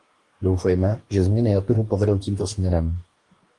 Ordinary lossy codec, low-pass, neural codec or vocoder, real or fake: Opus, 24 kbps; 10.8 kHz; codec, 44.1 kHz, 2.6 kbps, DAC; fake